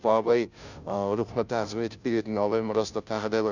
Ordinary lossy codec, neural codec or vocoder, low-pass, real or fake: none; codec, 16 kHz, 0.5 kbps, FunCodec, trained on Chinese and English, 25 frames a second; 7.2 kHz; fake